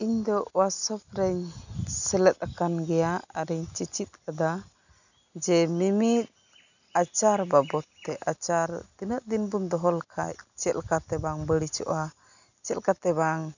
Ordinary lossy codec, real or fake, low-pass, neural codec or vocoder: none; real; 7.2 kHz; none